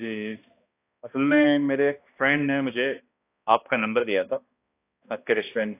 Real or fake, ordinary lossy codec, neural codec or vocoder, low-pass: fake; AAC, 24 kbps; codec, 16 kHz, 1 kbps, X-Codec, HuBERT features, trained on balanced general audio; 3.6 kHz